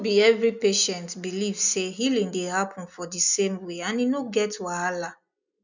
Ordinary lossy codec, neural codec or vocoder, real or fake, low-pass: none; none; real; 7.2 kHz